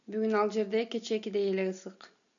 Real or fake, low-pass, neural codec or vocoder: real; 7.2 kHz; none